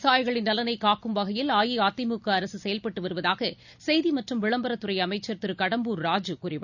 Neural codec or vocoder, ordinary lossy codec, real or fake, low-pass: none; none; real; 7.2 kHz